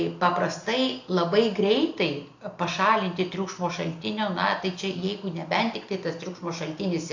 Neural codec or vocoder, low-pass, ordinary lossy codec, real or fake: none; 7.2 kHz; AAC, 48 kbps; real